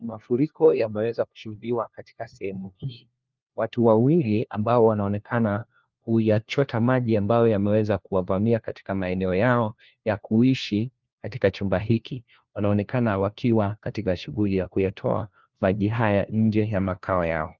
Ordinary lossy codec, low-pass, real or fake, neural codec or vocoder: Opus, 32 kbps; 7.2 kHz; fake; codec, 16 kHz, 1 kbps, FunCodec, trained on LibriTTS, 50 frames a second